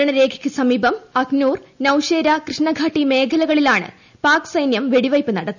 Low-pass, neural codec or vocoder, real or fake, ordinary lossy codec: 7.2 kHz; none; real; none